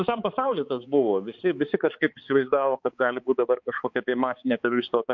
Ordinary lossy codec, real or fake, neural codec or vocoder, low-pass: MP3, 48 kbps; fake; codec, 16 kHz, 4 kbps, X-Codec, HuBERT features, trained on balanced general audio; 7.2 kHz